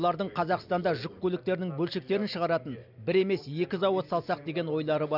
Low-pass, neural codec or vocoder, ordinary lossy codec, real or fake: 5.4 kHz; none; none; real